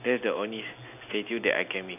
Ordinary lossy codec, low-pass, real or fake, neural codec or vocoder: none; 3.6 kHz; real; none